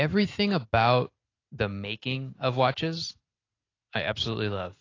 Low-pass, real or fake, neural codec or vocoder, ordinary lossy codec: 7.2 kHz; real; none; AAC, 32 kbps